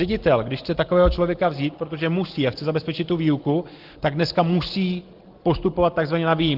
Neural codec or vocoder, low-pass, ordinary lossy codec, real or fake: none; 5.4 kHz; Opus, 16 kbps; real